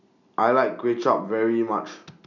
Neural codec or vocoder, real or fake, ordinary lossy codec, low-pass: none; real; none; 7.2 kHz